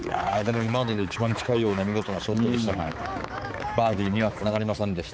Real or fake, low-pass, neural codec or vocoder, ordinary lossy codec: fake; none; codec, 16 kHz, 4 kbps, X-Codec, HuBERT features, trained on balanced general audio; none